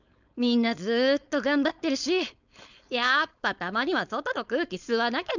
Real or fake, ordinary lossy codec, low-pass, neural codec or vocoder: fake; none; 7.2 kHz; codec, 16 kHz, 4.8 kbps, FACodec